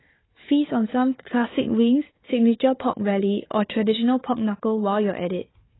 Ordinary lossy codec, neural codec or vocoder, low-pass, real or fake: AAC, 16 kbps; codec, 16 kHz, 4 kbps, FunCodec, trained on Chinese and English, 50 frames a second; 7.2 kHz; fake